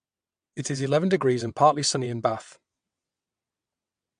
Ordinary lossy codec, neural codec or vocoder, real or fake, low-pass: MP3, 64 kbps; vocoder, 22.05 kHz, 80 mel bands, WaveNeXt; fake; 9.9 kHz